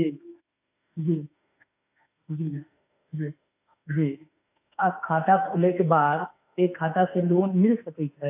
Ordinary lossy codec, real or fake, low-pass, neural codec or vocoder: AAC, 32 kbps; fake; 3.6 kHz; autoencoder, 48 kHz, 32 numbers a frame, DAC-VAE, trained on Japanese speech